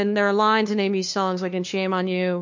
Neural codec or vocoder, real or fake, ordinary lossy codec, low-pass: codec, 16 kHz, 2 kbps, FunCodec, trained on LibriTTS, 25 frames a second; fake; MP3, 48 kbps; 7.2 kHz